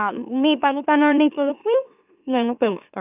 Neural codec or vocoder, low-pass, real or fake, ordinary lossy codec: autoencoder, 44.1 kHz, a latent of 192 numbers a frame, MeloTTS; 3.6 kHz; fake; none